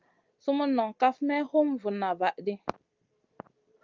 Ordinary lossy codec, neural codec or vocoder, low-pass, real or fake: Opus, 24 kbps; none; 7.2 kHz; real